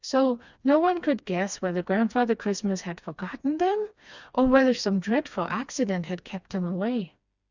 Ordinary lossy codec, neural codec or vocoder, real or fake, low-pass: Opus, 64 kbps; codec, 16 kHz, 2 kbps, FreqCodec, smaller model; fake; 7.2 kHz